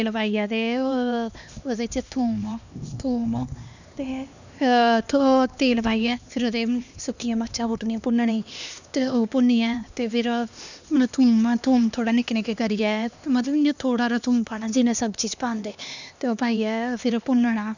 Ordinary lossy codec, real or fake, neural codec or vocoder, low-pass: none; fake; codec, 16 kHz, 2 kbps, X-Codec, HuBERT features, trained on LibriSpeech; 7.2 kHz